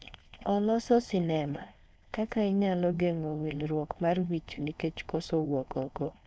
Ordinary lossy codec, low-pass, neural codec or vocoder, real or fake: none; none; codec, 16 kHz, 4.8 kbps, FACodec; fake